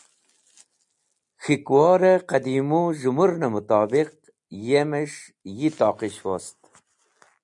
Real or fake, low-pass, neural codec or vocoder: real; 10.8 kHz; none